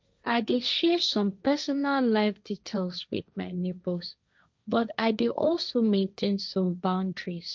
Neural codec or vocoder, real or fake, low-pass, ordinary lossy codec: codec, 16 kHz, 1.1 kbps, Voila-Tokenizer; fake; none; none